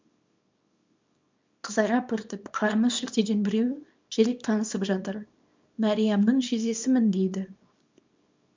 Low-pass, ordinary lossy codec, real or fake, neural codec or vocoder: 7.2 kHz; MP3, 64 kbps; fake; codec, 24 kHz, 0.9 kbps, WavTokenizer, small release